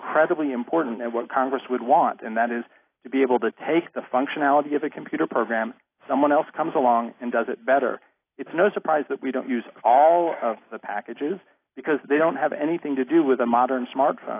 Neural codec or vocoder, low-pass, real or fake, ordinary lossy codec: none; 3.6 kHz; real; AAC, 24 kbps